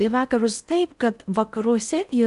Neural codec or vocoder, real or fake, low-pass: codec, 16 kHz in and 24 kHz out, 0.6 kbps, FocalCodec, streaming, 4096 codes; fake; 10.8 kHz